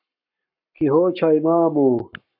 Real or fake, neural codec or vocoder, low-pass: fake; codec, 44.1 kHz, 7.8 kbps, Pupu-Codec; 5.4 kHz